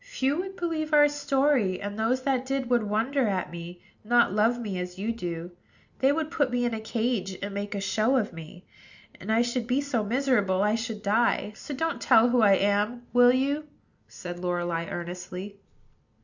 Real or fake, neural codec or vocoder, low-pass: real; none; 7.2 kHz